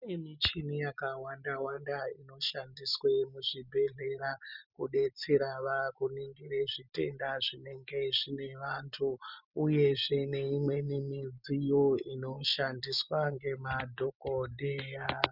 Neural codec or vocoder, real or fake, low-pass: none; real; 5.4 kHz